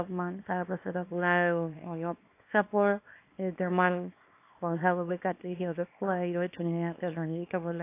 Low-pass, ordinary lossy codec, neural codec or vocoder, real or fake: 3.6 kHz; AAC, 24 kbps; codec, 24 kHz, 0.9 kbps, WavTokenizer, small release; fake